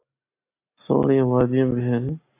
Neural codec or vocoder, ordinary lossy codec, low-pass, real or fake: none; AAC, 24 kbps; 3.6 kHz; real